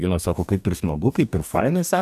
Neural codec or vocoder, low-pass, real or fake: codec, 44.1 kHz, 2.6 kbps, DAC; 14.4 kHz; fake